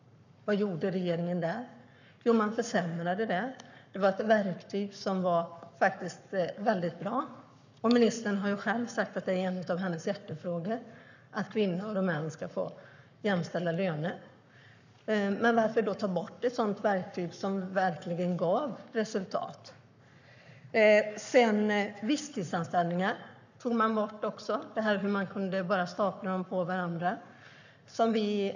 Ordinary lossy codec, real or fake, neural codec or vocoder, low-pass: none; fake; codec, 44.1 kHz, 7.8 kbps, Pupu-Codec; 7.2 kHz